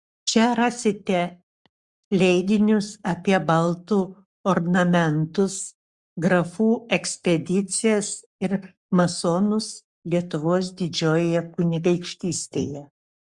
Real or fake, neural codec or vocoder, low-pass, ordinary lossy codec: fake; codec, 44.1 kHz, 7.8 kbps, Pupu-Codec; 10.8 kHz; Opus, 64 kbps